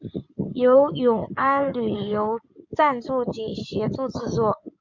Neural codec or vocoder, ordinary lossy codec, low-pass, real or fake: codec, 16 kHz in and 24 kHz out, 2.2 kbps, FireRedTTS-2 codec; MP3, 64 kbps; 7.2 kHz; fake